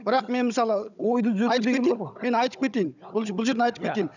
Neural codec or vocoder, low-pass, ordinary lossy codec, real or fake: codec, 16 kHz, 16 kbps, FunCodec, trained on Chinese and English, 50 frames a second; 7.2 kHz; none; fake